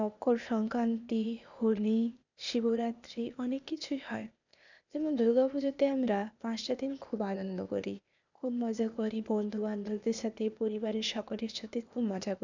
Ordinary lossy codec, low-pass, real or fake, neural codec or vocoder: none; 7.2 kHz; fake; codec, 16 kHz, 0.8 kbps, ZipCodec